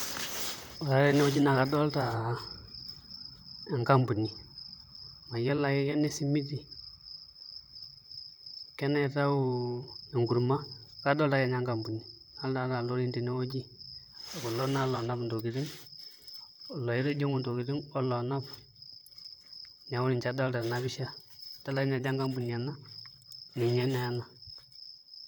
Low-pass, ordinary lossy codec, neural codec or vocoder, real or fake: none; none; vocoder, 44.1 kHz, 128 mel bands, Pupu-Vocoder; fake